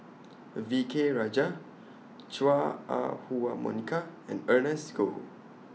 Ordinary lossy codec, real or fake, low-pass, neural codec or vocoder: none; real; none; none